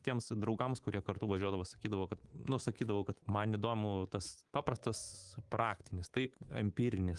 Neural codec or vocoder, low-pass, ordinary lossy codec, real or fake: codec, 24 kHz, 3.1 kbps, DualCodec; 10.8 kHz; Opus, 24 kbps; fake